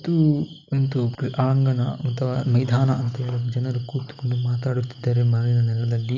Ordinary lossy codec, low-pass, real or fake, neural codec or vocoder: none; 7.2 kHz; real; none